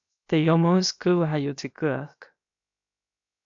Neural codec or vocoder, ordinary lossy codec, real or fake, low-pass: codec, 16 kHz, about 1 kbps, DyCAST, with the encoder's durations; AAC, 64 kbps; fake; 7.2 kHz